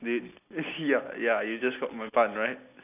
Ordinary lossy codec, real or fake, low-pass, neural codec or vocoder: none; real; 3.6 kHz; none